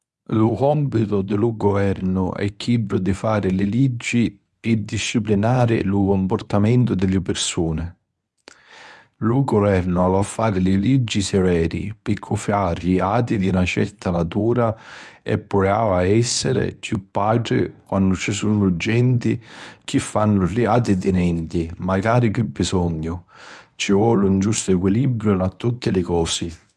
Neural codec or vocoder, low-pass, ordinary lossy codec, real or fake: codec, 24 kHz, 0.9 kbps, WavTokenizer, medium speech release version 1; none; none; fake